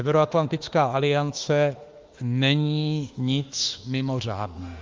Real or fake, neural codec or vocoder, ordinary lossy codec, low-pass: fake; autoencoder, 48 kHz, 32 numbers a frame, DAC-VAE, trained on Japanese speech; Opus, 32 kbps; 7.2 kHz